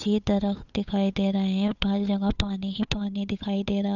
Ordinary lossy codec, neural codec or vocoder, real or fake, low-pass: none; codec, 16 kHz, 8 kbps, FunCodec, trained on Chinese and English, 25 frames a second; fake; 7.2 kHz